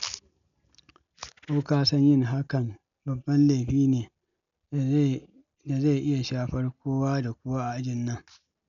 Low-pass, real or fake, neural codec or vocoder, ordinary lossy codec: 7.2 kHz; real; none; none